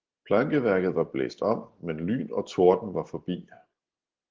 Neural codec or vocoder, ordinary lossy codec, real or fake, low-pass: none; Opus, 16 kbps; real; 7.2 kHz